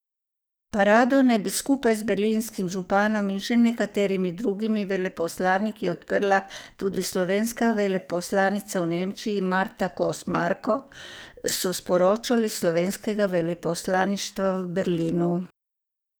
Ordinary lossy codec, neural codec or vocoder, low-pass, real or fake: none; codec, 44.1 kHz, 2.6 kbps, SNAC; none; fake